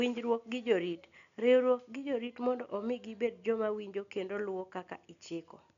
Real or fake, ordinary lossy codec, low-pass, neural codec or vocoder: real; none; 7.2 kHz; none